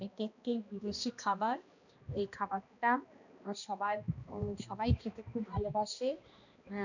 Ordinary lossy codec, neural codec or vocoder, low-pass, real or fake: none; codec, 16 kHz, 1 kbps, X-Codec, HuBERT features, trained on balanced general audio; 7.2 kHz; fake